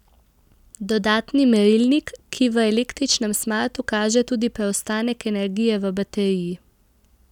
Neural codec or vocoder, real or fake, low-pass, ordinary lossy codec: none; real; 19.8 kHz; none